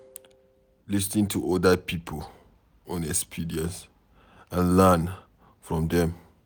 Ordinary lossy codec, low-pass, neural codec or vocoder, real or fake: none; none; none; real